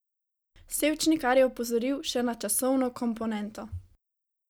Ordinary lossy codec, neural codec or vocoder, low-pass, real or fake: none; none; none; real